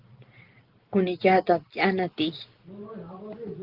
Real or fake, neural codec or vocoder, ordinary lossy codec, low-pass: real; none; Opus, 16 kbps; 5.4 kHz